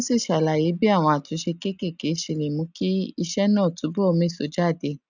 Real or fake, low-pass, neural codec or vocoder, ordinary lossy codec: real; 7.2 kHz; none; none